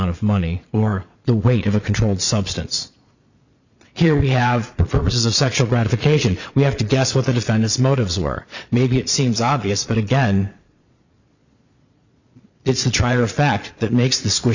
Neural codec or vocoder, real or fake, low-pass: vocoder, 22.05 kHz, 80 mel bands, Vocos; fake; 7.2 kHz